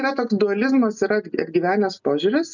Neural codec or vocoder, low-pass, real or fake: none; 7.2 kHz; real